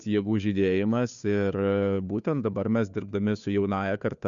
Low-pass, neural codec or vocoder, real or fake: 7.2 kHz; codec, 16 kHz, 2 kbps, FunCodec, trained on Chinese and English, 25 frames a second; fake